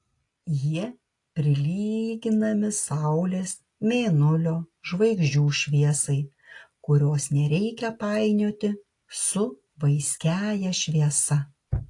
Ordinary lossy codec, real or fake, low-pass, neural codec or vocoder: AAC, 48 kbps; real; 10.8 kHz; none